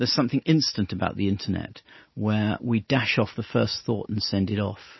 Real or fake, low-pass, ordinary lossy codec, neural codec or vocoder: real; 7.2 kHz; MP3, 24 kbps; none